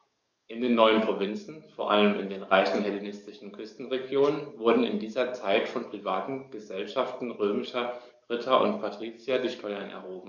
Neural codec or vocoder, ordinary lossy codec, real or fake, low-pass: codec, 44.1 kHz, 7.8 kbps, DAC; none; fake; 7.2 kHz